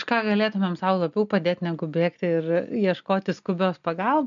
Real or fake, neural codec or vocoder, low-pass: real; none; 7.2 kHz